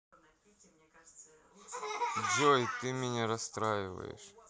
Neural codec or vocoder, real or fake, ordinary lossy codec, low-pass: none; real; none; none